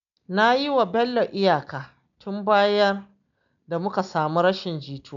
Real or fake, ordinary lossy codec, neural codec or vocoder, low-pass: real; none; none; 7.2 kHz